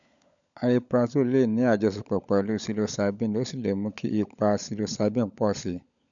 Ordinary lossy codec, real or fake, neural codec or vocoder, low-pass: none; fake; codec, 16 kHz, 16 kbps, FunCodec, trained on LibriTTS, 50 frames a second; 7.2 kHz